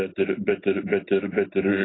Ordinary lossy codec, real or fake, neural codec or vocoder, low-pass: AAC, 16 kbps; real; none; 7.2 kHz